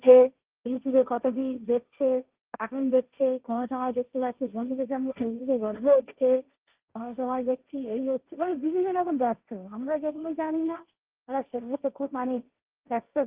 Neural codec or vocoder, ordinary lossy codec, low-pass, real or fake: codec, 16 kHz, 1.1 kbps, Voila-Tokenizer; Opus, 24 kbps; 3.6 kHz; fake